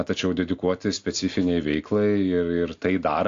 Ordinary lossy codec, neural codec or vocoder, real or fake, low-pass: AAC, 48 kbps; none; real; 7.2 kHz